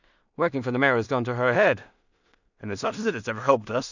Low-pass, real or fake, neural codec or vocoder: 7.2 kHz; fake; codec, 16 kHz in and 24 kHz out, 0.4 kbps, LongCat-Audio-Codec, two codebook decoder